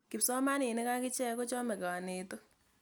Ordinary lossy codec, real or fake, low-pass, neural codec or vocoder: none; real; none; none